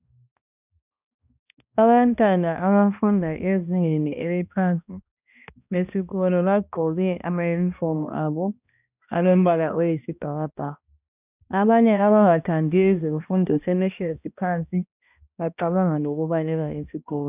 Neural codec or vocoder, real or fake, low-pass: codec, 16 kHz, 1 kbps, X-Codec, HuBERT features, trained on balanced general audio; fake; 3.6 kHz